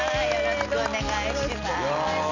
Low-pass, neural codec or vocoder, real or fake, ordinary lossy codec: 7.2 kHz; none; real; none